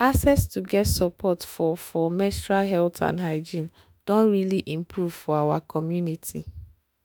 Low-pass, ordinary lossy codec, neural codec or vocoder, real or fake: none; none; autoencoder, 48 kHz, 32 numbers a frame, DAC-VAE, trained on Japanese speech; fake